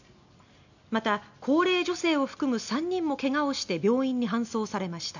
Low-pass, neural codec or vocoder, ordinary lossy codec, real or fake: 7.2 kHz; none; none; real